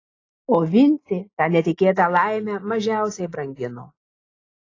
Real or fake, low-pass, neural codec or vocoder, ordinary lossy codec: real; 7.2 kHz; none; AAC, 32 kbps